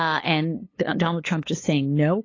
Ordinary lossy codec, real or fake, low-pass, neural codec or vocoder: AAC, 32 kbps; fake; 7.2 kHz; codec, 16 kHz, 8 kbps, FunCodec, trained on LibriTTS, 25 frames a second